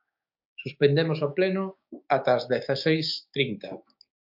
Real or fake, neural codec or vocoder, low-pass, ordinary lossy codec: fake; codec, 16 kHz, 6 kbps, DAC; 5.4 kHz; MP3, 48 kbps